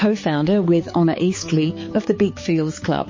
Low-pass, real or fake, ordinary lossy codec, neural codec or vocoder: 7.2 kHz; fake; MP3, 32 kbps; codec, 16 kHz, 4 kbps, X-Codec, HuBERT features, trained on balanced general audio